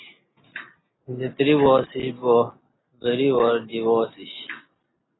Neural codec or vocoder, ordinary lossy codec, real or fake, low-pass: none; AAC, 16 kbps; real; 7.2 kHz